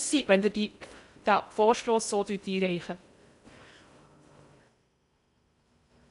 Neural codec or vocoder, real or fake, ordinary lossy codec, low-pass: codec, 16 kHz in and 24 kHz out, 0.6 kbps, FocalCodec, streaming, 2048 codes; fake; AAC, 96 kbps; 10.8 kHz